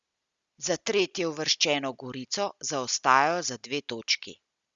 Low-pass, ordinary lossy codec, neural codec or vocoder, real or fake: 7.2 kHz; Opus, 64 kbps; none; real